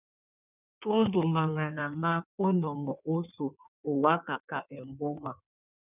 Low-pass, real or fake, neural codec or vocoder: 3.6 kHz; fake; codec, 16 kHz in and 24 kHz out, 1.1 kbps, FireRedTTS-2 codec